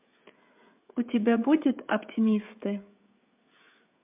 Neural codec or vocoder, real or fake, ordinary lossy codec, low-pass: codec, 16 kHz, 16 kbps, FreqCodec, smaller model; fake; MP3, 32 kbps; 3.6 kHz